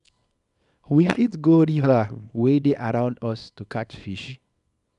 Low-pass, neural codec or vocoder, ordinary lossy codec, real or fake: 10.8 kHz; codec, 24 kHz, 0.9 kbps, WavTokenizer, small release; none; fake